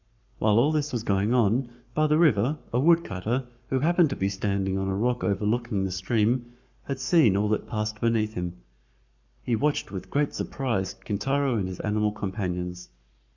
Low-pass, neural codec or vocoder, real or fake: 7.2 kHz; codec, 44.1 kHz, 7.8 kbps, Pupu-Codec; fake